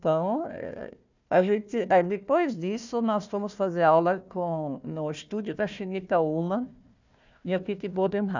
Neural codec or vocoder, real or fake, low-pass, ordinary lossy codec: codec, 16 kHz, 1 kbps, FunCodec, trained on Chinese and English, 50 frames a second; fake; 7.2 kHz; none